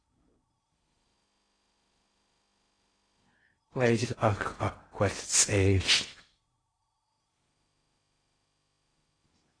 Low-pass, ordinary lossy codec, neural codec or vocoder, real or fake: 9.9 kHz; AAC, 32 kbps; codec, 16 kHz in and 24 kHz out, 0.6 kbps, FocalCodec, streaming, 4096 codes; fake